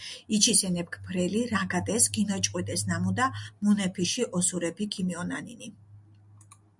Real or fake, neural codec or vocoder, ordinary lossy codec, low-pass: real; none; MP3, 96 kbps; 10.8 kHz